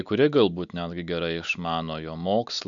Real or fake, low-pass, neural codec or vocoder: real; 7.2 kHz; none